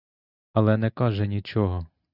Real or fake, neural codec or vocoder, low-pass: real; none; 5.4 kHz